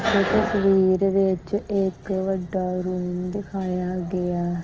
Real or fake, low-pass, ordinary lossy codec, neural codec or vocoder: real; 7.2 kHz; Opus, 16 kbps; none